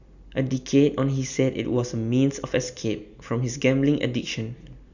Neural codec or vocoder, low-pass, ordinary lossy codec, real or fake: none; 7.2 kHz; none; real